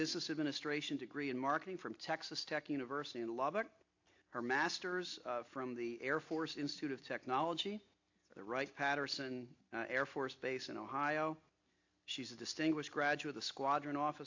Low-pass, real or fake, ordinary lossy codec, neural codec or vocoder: 7.2 kHz; real; AAC, 48 kbps; none